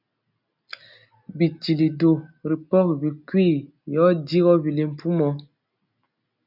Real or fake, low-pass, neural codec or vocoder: real; 5.4 kHz; none